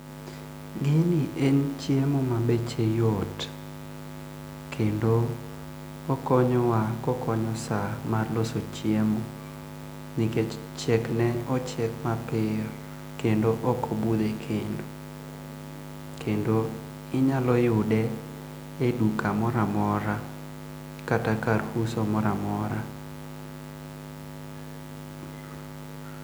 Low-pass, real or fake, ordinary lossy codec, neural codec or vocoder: none; real; none; none